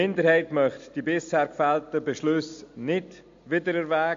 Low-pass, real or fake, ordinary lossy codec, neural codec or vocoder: 7.2 kHz; real; MP3, 48 kbps; none